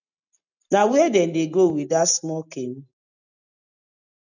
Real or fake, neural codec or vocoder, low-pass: real; none; 7.2 kHz